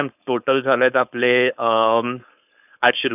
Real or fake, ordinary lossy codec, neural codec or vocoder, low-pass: fake; none; codec, 16 kHz, 4.8 kbps, FACodec; 3.6 kHz